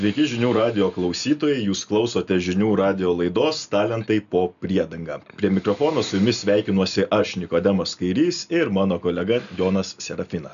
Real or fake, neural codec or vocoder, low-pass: real; none; 7.2 kHz